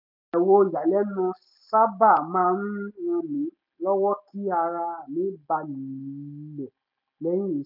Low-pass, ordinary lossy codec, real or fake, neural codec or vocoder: 5.4 kHz; none; real; none